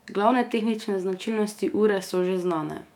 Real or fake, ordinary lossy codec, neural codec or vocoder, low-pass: fake; none; autoencoder, 48 kHz, 128 numbers a frame, DAC-VAE, trained on Japanese speech; 19.8 kHz